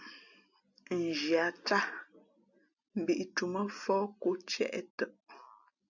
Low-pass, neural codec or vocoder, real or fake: 7.2 kHz; none; real